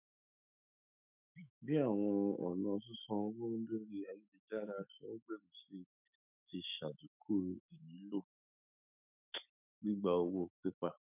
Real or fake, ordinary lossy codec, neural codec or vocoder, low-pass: fake; none; autoencoder, 48 kHz, 128 numbers a frame, DAC-VAE, trained on Japanese speech; 3.6 kHz